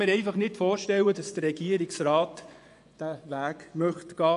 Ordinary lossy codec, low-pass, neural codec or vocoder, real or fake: none; 10.8 kHz; vocoder, 24 kHz, 100 mel bands, Vocos; fake